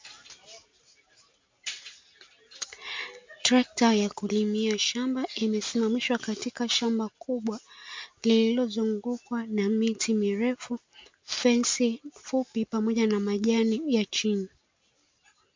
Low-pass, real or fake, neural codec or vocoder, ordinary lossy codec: 7.2 kHz; real; none; MP3, 48 kbps